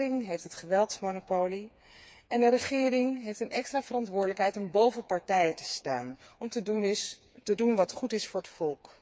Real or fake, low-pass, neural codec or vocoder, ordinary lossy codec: fake; none; codec, 16 kHz, 4 kbps, FreqCodec, smaller model; none